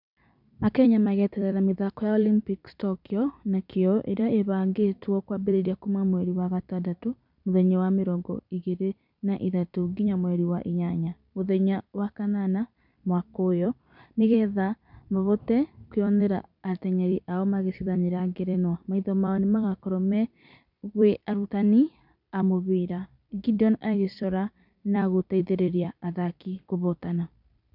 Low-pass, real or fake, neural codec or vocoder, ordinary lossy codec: 5.4 kHz; fake; vocoder, 44.1 kHz, 128 mel bands every 256 samples, BigVGAN v2; none